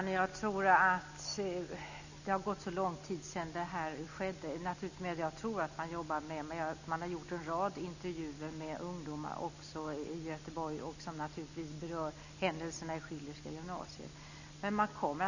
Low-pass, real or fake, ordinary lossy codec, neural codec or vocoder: 7.2 kHz; real; none; none